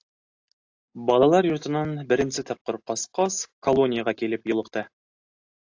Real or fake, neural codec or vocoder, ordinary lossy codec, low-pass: real; none; AAC, 48 kbps; 7.2 kHz